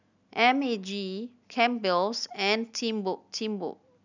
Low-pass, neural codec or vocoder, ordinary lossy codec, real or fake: 7.2 kHz; none; none; real